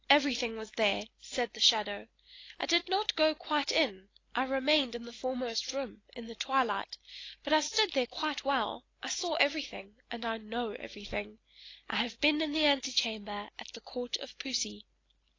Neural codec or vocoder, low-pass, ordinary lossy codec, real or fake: none; 7.2 kHz; AAC, 32 kbps; real